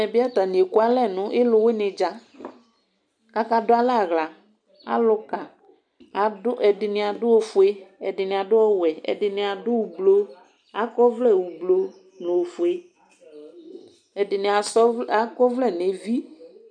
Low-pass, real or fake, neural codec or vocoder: 9.9 kHz; real; none